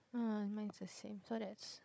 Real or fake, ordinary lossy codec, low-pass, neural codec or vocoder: fake; none; none; codec, 16 kHz, 16 kbps, FunCodec, trained on Chinese and English, 50 frames a second